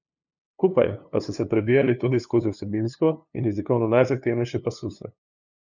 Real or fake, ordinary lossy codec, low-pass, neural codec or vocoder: fake; none; 7.2 kHz; codec, 16 kHz, 2 kbps, FunCodec, trained on LibriTTS, 25 frames a second